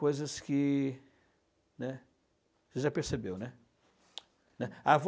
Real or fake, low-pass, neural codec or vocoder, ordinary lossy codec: real; none; none; none